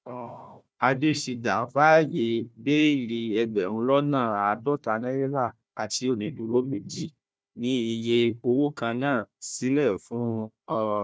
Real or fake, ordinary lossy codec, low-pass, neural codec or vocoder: fake; none; none; codec, 16 kHz, 1 kbps, FunCodec, trained on Chinese and English, 50 frames a second